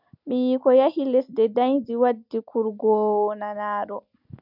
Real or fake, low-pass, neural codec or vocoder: real; 5.4 kHz; none